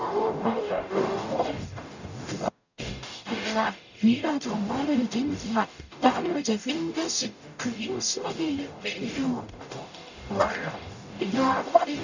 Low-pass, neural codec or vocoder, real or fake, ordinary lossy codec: 7.2 kHz; codec, 44.1 kHz, 0.9 kbps, DAC; fake; none